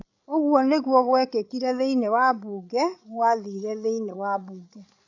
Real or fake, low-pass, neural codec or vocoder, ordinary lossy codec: fake; 7.2 kHz; codec, 16 kHz, 16 kbps, FreqCodec, larger model; none